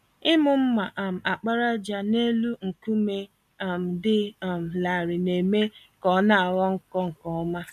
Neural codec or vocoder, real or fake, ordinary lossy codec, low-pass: none; real; none; 14.4 kHz